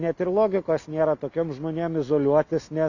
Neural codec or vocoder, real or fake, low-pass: none; real; 7.2 kHz